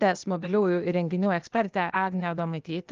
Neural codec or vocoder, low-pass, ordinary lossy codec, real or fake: codec, 16 kHz, 0.8 kbps, ZipCodec; 7.2 kHz; Opus, 24 kbps; fake